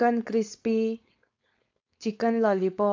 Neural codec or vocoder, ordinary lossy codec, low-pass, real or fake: codec, 16 kHz, 4.8 kbps, FACodec; AAC, 48 kbps; 7.2 kHz; fake